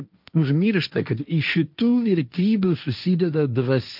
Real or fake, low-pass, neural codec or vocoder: fake; 5.4 kHz; codec, 16 kHz, 1.1 kbps, Voila-Tokenizer